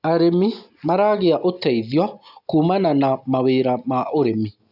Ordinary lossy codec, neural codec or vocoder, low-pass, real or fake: AAC, 48 kbps; none; 5.4 kHz; real